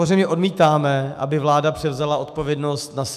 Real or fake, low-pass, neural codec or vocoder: fake; 14.4 kHz; autoencoder, 48 kHz, 128 numbers a frame, DAC-VAE, trained on Japanese speech